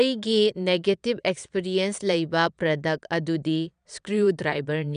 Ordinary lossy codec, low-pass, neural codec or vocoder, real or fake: none; 9.9 kHz; vocoder, 22.05 kHz, 80 mel bands, Vocos; fake